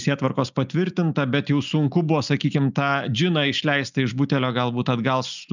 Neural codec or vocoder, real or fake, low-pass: none; real; 7.2 kHz